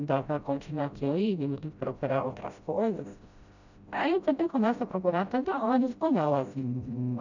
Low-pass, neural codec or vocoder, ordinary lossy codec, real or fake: 7.2 kHz; codec, 16 kHz, 0.5 kbps, FreqCodec, smaller model; none; fake